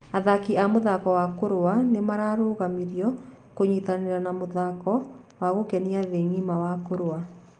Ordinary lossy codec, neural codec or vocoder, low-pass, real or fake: Opus, 32 kbps; none; 9.9 kHz; real